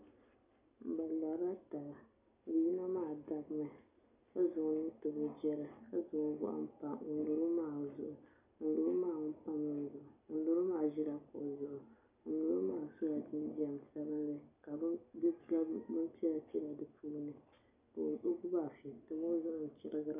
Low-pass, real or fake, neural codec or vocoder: 3.6 kHz; real; none